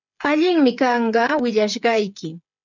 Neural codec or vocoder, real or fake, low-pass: codec, 16 kHz, 8 kbps, FreqCodec, smaller model; fake; 7.2 kHz